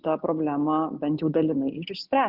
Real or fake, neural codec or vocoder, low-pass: real; none; 5.4 kHz